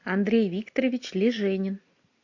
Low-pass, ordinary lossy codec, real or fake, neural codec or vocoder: 7.2 kHz; AAC, 48 kbps; real; none